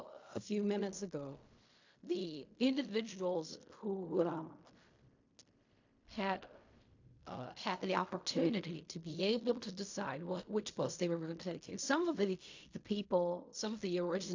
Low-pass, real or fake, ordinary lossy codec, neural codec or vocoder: 7.2 kHz; fake; AAC, 48 kbps; codec, 16 kHz in and 24 kHz out, 0.4 kbps, LongCat-Audio-Codec, fine tuned four codebook decoder